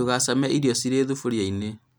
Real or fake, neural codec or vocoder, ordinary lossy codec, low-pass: real; none; none; none